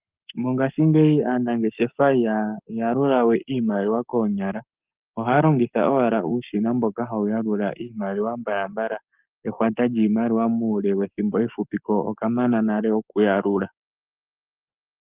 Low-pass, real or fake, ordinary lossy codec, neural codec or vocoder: 3.6 kHz; fake; Opus, 24 kbps; codec, 44.1 kHz, 7.8 kbps, Pupu-Codec